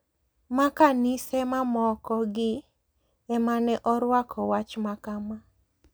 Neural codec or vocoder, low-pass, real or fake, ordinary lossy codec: none; none; real; none